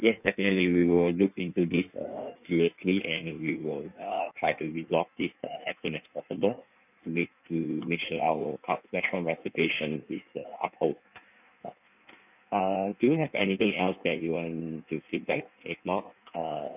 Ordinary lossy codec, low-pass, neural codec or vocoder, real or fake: none; 3.6 kHz; codec, 16 kHz in and 24 kHz out, 1.1 kbps, FireRedTTS-2 codec; fake